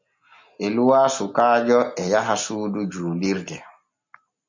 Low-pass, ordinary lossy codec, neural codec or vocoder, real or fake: 7.2 kHz; MP3, 64 kbps; none; real